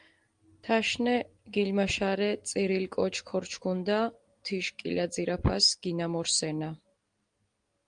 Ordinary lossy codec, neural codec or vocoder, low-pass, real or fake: Opus, 24 kbps; none; 9.9 kHz; real